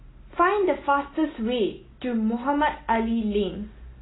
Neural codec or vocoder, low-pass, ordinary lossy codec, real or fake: none; 7.2 kHz; AAC, 16 kbps; real